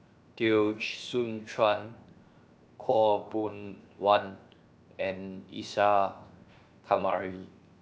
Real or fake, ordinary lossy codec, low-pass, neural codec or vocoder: fake; none; none; codec, 16 kHz, 0.7 kbps, FocalCodec